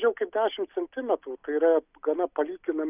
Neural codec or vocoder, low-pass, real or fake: none; 3.6 kHz; real